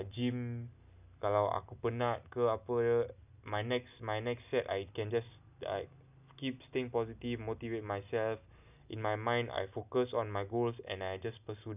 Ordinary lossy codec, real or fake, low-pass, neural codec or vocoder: none; real; 3.6 kHz; none